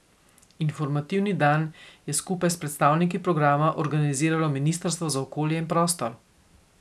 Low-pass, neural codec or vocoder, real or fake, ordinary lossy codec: none; none; real; none